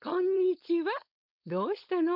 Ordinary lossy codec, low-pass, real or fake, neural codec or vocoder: none; 5.4 kHz; fake; codec, 16 kHz, 4.8 kbps, FACodec